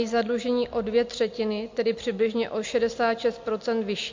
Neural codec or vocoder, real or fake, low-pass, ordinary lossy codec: none; real; 7.2 kHz; MP3, 48 kbps